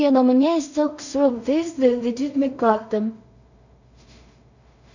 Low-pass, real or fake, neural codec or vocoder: 7.2 kHz; fake; codec, 16 kHz in and 24 kHz out, 0.4 kbps, LongCat-Audio-Codec, two codebook decoder